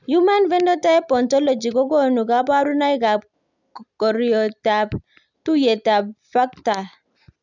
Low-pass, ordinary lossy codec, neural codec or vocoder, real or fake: 7.2 kHz; none; none; real